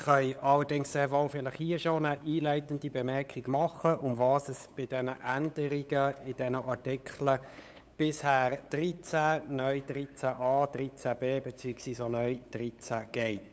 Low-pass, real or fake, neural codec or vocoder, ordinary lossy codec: none; fake; codec, 16 kHz, 8 kbps, FunCodec, trained on LibriTTS, 25 frames a second; none